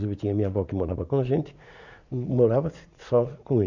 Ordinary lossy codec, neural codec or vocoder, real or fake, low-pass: none; vocoder, 44.1 kHz, 80 mel bands, Vocos; fake; 7.2 kHz